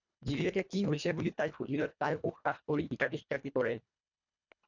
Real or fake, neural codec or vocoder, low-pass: fake; codec, 24 kHz, 1.5 kbps, HILCodec; 7.2 kHz